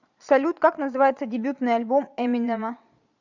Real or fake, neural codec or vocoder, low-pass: fake; vocoder, 22.05 kHz, 80 mel bands, Vocos; 7.2 kHz